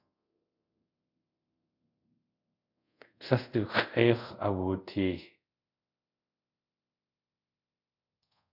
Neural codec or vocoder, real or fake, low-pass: codec, 24 kHz, 0.5 kbps, DualCodec; fake; 5.4 kHz